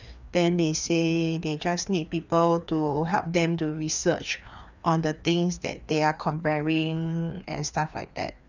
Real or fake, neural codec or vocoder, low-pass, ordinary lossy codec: fake; codec, 16 kHz, 2 kbps, FreqCodec, larger model; 7.2 kHz; none